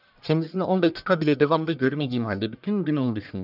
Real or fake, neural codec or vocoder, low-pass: fake; codec, 44.1 kHz, 1.7 kbps, Pupu-Codec; 5.4 kHz